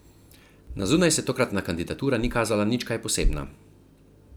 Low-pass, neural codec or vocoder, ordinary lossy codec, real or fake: none; none; none; real